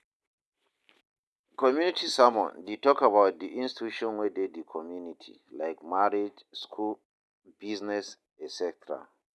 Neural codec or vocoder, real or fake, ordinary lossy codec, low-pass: none; real; none; none